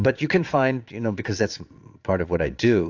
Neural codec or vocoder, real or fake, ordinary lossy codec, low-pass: vocoder, 22.05 kHz, 80 mel bands, Vocos; fake; AAC, 48 kbps; 7.2 kHz